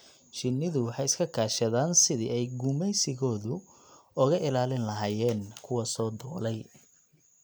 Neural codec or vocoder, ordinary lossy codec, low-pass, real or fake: none; none; none; real